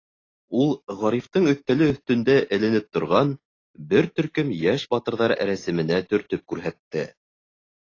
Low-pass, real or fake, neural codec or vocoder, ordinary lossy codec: 7.2 kHz; real; none; AAC, 32 kbps